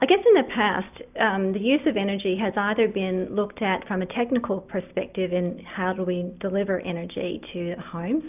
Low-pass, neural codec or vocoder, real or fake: 3.6 kHz; none; real